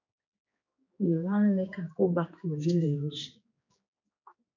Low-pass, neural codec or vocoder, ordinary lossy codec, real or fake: 7.2 kHz; codec, 16 kHz, 2 kbps, X-Codec, HuBERT features, trained on general audio; MP3, 48 kbps; fake